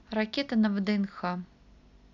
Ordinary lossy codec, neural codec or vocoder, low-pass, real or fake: none; none; 7.2 kHz; real